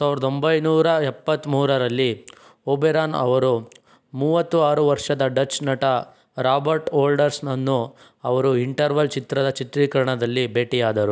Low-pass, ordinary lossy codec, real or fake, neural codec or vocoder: none; none; real; none